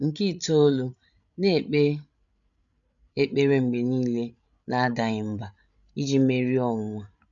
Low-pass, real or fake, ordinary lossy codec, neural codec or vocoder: 7.2 kHz; fake; none; codec, 16 kHz, 16 kbps, FreqCodec, larger model